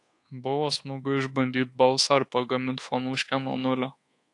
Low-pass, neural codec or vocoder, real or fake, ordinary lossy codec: 10.8 kHz; codec, 24 kHz, 1.2 kbps, DualCodec; fake; AAC, 48 kbps